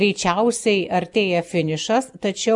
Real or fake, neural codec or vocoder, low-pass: real; none; 10.8 kHz